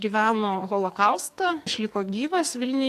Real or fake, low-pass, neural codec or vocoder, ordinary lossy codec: fake; 14.4 kHz; codec, 44.1 kHz, 2.6 kbps, SNAC; AAC, 64 kbps